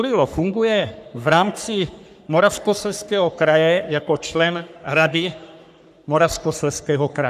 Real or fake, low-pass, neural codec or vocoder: fake; 14.4 kHz; codec, 44.1 kHz, 3.4 kbps, Pupu-Codec